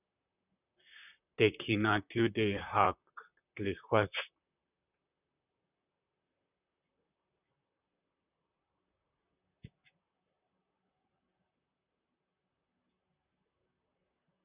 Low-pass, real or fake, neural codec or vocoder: 3.6 kHz; fake; vocoder, 44.1 kHz, 128 mel bands, Pupu-Vocoder